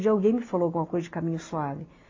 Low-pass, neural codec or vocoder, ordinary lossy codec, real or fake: 7.2 kHz; none; AAC, 32 kbps; real